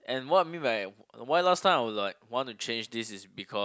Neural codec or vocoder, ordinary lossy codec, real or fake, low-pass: none; none; real; none